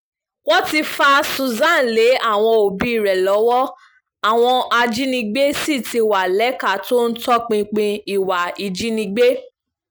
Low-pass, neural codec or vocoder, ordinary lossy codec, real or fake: none; none; none; real